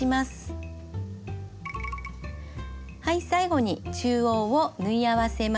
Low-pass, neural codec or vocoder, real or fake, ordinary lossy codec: none; none; real; none